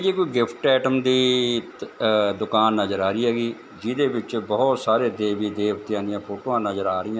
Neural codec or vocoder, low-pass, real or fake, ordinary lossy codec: none; none; real; none